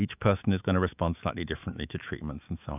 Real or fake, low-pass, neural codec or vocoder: fake; 3.6 kHz; autoencoder, 48 kHz, 128 numbers a frame, DAC-VAE, trained on Japanese speech